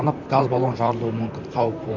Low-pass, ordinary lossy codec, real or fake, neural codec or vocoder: 7.2 kHz; none; fake; vocoder, 44.1 kHz, 128 mel bands, Pupu-Vocoder